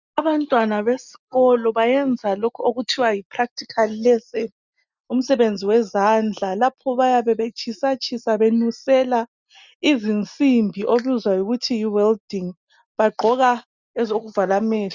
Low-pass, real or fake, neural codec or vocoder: 7.2 kHz; real; none